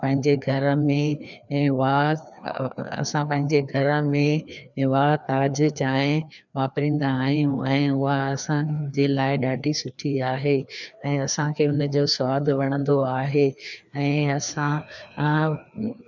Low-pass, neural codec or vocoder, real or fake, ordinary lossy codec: 7.2 kHz; codec, 16 kHz, 2 kbps, FreqCodec, larger model; fake; none